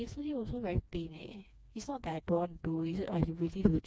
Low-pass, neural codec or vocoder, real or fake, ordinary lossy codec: none; codec, 16 kHz, 2 kbps, FreqCodec, smaller model; fake; none